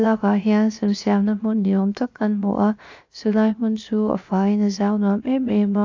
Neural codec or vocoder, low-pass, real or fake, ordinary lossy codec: codec, 16 kHz, 0.7 kbps, FocalCodec; 7.2 kHz; fake; AAC, 48 kbps